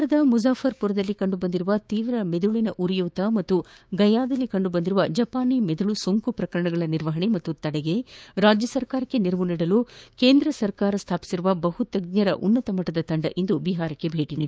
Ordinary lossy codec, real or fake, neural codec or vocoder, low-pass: none; fake; codec, 16 kHz, 6 kbps, DAC; none